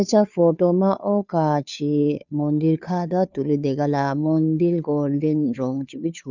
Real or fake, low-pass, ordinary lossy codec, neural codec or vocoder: fake; 7.2 kHz; none; codec, 16 kHz, 2 kbps, FunCodec, trained on Chinese and English, 25 frames a second